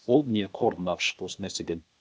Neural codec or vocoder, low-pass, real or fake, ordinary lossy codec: codec, 16 kHz, 0.8 kbps, ZipCodec; none; fake; none